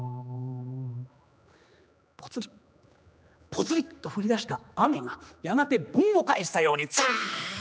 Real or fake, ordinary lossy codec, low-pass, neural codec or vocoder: fake; none; none; codec, 16 kHz, 2 kbps, X-Codec, HuBERT features, trained on general audio